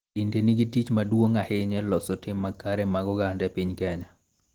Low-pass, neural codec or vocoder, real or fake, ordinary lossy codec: 19.8 kHz; none; real; Opus, 16 kbps